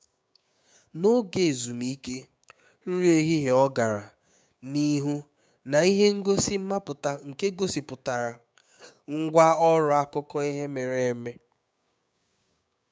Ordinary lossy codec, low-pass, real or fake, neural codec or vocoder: none; none; fake; codec, 16 kHz, 6 kbps, DAC